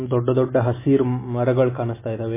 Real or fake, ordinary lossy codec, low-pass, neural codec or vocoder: real; MP3, 16 kbps; 3.6 kHz; none